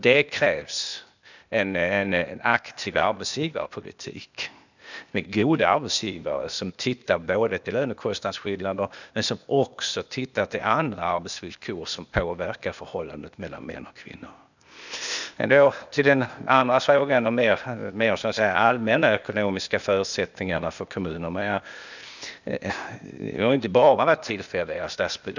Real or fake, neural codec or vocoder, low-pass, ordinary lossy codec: fake; codec, 16 kHz, 0.8 kbps, ZipCodec; 7.2 kHz; none